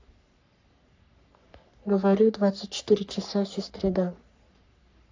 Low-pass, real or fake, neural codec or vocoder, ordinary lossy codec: 7.2 kHz; fake; codec, 44.1 kHz, 3.4 kbps, Pupu-Codec; AAC, 48 kbps